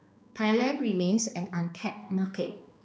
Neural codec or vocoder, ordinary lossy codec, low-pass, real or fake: codec, 16 kHz, 2 kbps, X-Codec, HuBERT features, trained on balanced general audio; none; none; fake